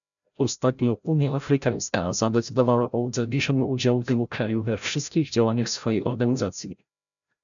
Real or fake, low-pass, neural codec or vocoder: fake; 7.2 kHz; codec, 16 kHz, 0.5 kbps, FreqCodec, larger model